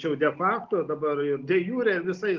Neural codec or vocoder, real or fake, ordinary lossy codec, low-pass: none; real; Opus, 32 kbps; 7.2 kHz